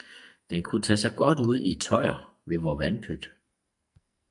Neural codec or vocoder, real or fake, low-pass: codec, 44.1 kHz, 2.6 kbps, SNAC; fake; 10.8 kHz